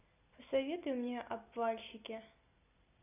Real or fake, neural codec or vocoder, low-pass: real; none; 3.6 kHz